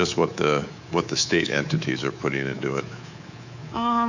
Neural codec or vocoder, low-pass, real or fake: codec, 24 kHz, 3.1 kbps, DualCodec; 7.2 kHz; fake